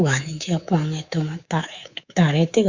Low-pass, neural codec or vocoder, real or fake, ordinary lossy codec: 7.2 kHz; codec, 24 kHz, 3.1 kbps, DualCodec; fake; Opus, 64 kbps